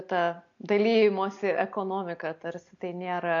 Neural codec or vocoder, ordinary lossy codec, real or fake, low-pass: none; AAC, 48 kbps; real; 7.2 kHz